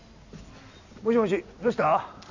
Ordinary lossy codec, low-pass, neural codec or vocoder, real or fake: none; 7.2 kHz; none; real